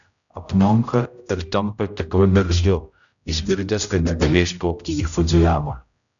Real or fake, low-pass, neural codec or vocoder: fake; 7.2 kHz; codec, 16 kHz, 0.5 kbps, X-Codec, HuBERT features, trained on general audio